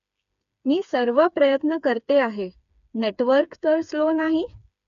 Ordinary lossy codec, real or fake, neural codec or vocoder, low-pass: none; fake; codec, 16 kHz, 4 kbps, FreqCodec, smaller model; 7.2 kHz